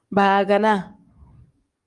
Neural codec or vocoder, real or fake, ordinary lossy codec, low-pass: codec, 44.1 kHz, 7.8 kbps, DAC; fake; Opus, 24 kbps; 10.8 kHz